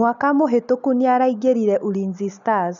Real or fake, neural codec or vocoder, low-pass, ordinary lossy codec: real; none; 7.2 kHz; none